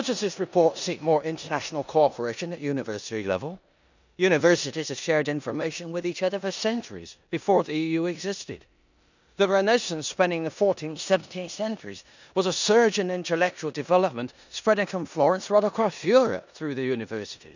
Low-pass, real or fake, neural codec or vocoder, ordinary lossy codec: 7.2 kHz; fake; codec, 16 kHz in and 24 kHz out, 0.9 kbps, LongCat-Audio-Codec, four codebook decoder; none